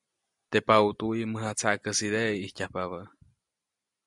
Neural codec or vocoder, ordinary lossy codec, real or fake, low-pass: none; MP3, 96 kbps; real; 10.8 kHz